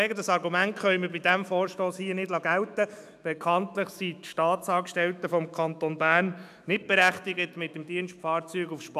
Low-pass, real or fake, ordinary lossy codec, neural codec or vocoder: 14.4 kHz; fake; none; autoencoder, 48 kHz, 128 numbers a frame, DAC-VAE, trained on Japanese speech